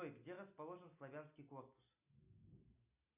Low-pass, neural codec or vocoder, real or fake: 3.6 kHz; none; real